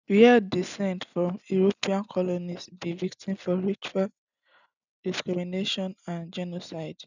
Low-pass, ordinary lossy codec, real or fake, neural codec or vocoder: 7.2 kHz; none; real; none